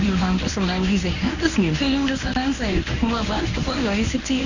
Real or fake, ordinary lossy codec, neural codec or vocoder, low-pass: fake; none; codec, 24 kHz, 0.9 kbps, WavTokenizer, medium speech release version 1; 7.2 kHz